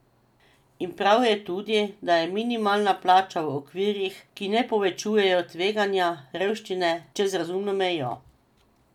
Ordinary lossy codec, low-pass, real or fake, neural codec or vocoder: none; 19.8 kHz; real; none